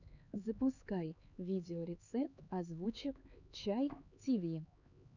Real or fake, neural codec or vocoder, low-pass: fake; codec, 16 kHz, 4 kbps, X-Codec, HuBERT features, trained on LibriSpeech; 7.2 kHz